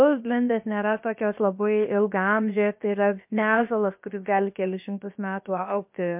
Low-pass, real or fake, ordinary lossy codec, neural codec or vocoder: 3.6 kHz; fake; MP3, 32 kbps; codec, 16 kHz, about 1 kbps, DyCAST, with the encoder's durations